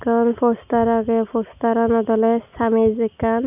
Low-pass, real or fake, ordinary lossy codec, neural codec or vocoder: 3.6 kHz; real; none; none